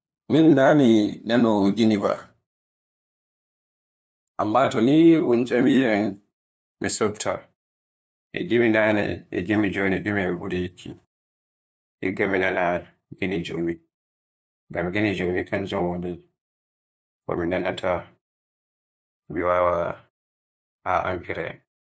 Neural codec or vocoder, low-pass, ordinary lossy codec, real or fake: codec, 16 kHz, 2 kbps, FunCodec, trained on LibriTTS, 25 frames a second; none; none; fake